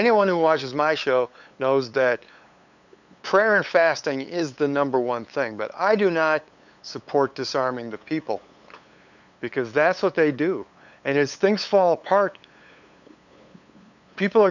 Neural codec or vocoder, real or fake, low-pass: codec, 16 kHz, 8 kbps, FunCodec, trained on LibriTTS, 25 frames a second; fake; 7.2 kHz